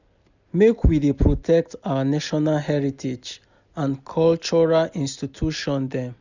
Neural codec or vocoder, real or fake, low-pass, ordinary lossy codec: none; real; 7.2 kHz; none